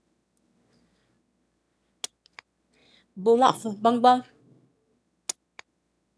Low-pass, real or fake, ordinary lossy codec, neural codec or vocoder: none; fake; none; autoencoder, 22.05 kHz, a latent of 192 numbers a frame, VITS, trained on one speaker